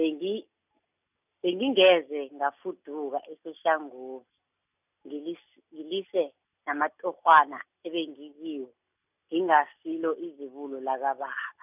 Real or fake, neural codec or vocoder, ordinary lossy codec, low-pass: real; none; none; 3.6 kHz